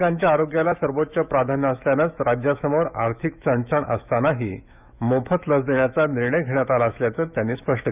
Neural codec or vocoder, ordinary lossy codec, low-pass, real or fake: autoencoder, 48 kHz, 128 numbers a frame, DAC-VAE, trained on Japanese speech; none; 3.6 kHz; fake